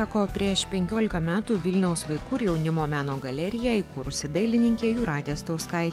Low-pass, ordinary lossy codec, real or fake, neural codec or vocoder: 19.8 kHz; MP3, 96 kbps; fake; codec, 44.1 kHz, 7.8 kbps, DAC